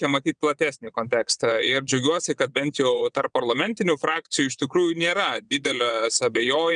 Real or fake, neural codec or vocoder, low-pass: fake; vocoder, 22.05 kHz, 80 mel bands, WaveNeXt; 9.9 kHz